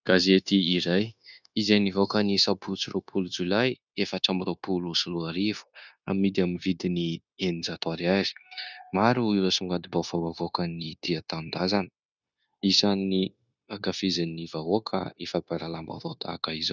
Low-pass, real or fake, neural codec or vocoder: 7.2 kHz; fake; codec, 16 kHz, 0.9 kbps, LongCat-Audio-Codec